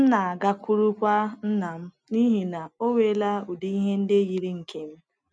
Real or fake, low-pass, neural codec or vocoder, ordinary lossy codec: real; none; none; none